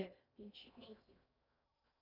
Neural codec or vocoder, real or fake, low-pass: codec, 16 kHz in and 24 kHz out, 0.6 kbps, FocalCodec, streaming, 4096 codes; fake; 5.4 kHz